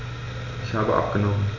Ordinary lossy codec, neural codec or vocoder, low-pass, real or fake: none; none; 7.2 kHz; real